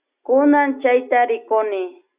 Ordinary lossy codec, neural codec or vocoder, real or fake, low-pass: Opus, 64 kbps; none; real; 3.6 kHz